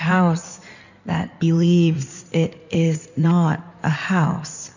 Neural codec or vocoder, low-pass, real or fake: codec, 16 kHz in and 24 kHz out, 2.2 kbps, FireRedTTS-2 codec; 7.2 kHz; fake